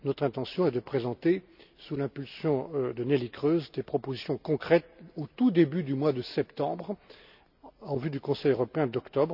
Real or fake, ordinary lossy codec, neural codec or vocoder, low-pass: real; none; none; 5.4 kHz